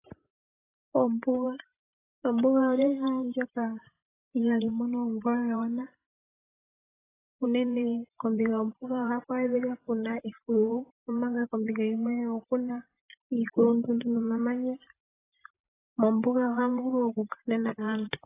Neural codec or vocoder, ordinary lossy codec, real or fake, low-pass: vocoder, 44.1 kHz, 128 mel bands every 512 samples, BigVGAN v2; AAC, 16 kbps; fake; 3.6 kHz